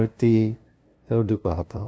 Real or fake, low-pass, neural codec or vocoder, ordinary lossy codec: fake; none; codec, 16 kHz, 0.5 kbps, FunCodec, trained on LibriTTS, 25 frames a second; none